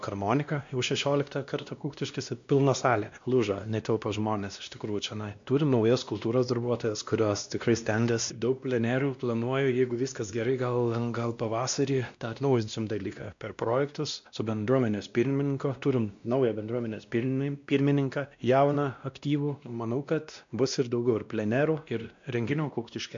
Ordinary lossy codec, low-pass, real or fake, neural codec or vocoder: MP3, 64 kbps; 7.2 kHz; fake; codec, 16 kHz, 1 kbps, X-Codec, WavLM features, trained on Multilingual LibriSpeech